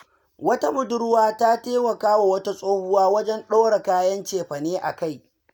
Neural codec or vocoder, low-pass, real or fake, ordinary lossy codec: none; none; real; none